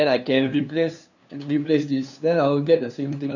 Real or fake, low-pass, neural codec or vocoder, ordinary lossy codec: fake; 7.2 kHz; codec, 16 kHz, 2 kbps, FunCodec, trained on LibriTTS, 25 frames a second; none